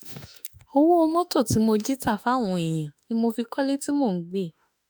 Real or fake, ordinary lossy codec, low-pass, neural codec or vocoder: fake; none; none; autoencoder, 48 kHz, 32 numbers a frame, DAC-VAE, trained on Japanese speech